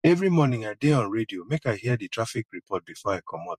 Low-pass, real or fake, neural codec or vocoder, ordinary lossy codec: 14.4 kHz; fake; vocoder, 44.1 kHz, 128 mel bands every 512 samples, BigVGAN v2; MP3, 64 kbps